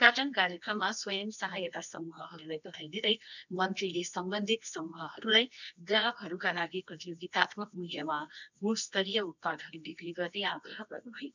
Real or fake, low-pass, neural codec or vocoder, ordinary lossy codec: fake; 7.2 kHz; codec, 24 kHz, 0.9 kbps, WavTokenizer, medium music audio release; none